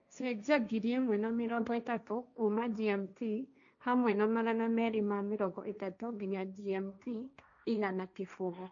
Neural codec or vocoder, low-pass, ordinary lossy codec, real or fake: codec, 16 kHz, 1.1 kbps, Voila-Tokenizer; 7.2 kHz; none; fake